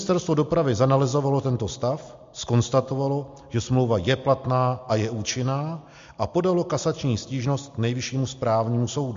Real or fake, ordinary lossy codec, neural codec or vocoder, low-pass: real; MP3, 48 kbps; none; 7.2 kHz